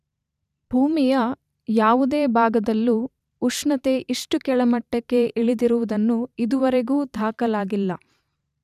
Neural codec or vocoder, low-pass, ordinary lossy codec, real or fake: vocoder, 44.1 kHz, 128 mel bands every 512 samples, BigVGAN v2; 14.4 kHz; none; fake